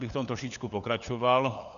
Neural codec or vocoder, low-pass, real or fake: codec, 16 kHz, 4 kbps, FunCodec, trained on LibriTTS, 50 frames a second; 7.2 kHz; fake